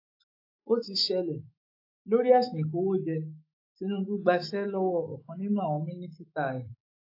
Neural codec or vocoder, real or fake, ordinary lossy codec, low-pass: autoencoder, 48 kHz, 128 numbers a frame, DAC-VAE, trained on Japanese speech; fake; AAC, 32 kbps; 5.4 kHz